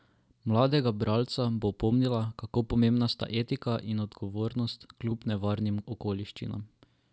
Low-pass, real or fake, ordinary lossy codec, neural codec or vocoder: none; real; none; none